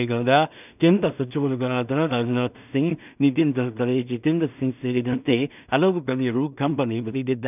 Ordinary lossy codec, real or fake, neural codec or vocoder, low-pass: none; fake; codec, 16 kHz in and 24 kHz out, 0.4 kbps, LongCat-Audio-Codec, two codebook decoder; 3.6 kHz